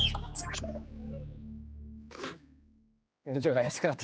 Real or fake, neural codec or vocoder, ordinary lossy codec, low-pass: fake; codec, 16 kHz, 2 kbps, X-Codec, HuBERT features, trained on general audio; none; none